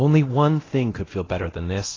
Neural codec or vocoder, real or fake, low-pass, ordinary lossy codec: codec, 24 kHz, 0.9 kbps, DualCodec; fake; 7.2 kHz; AAC, 32 kbps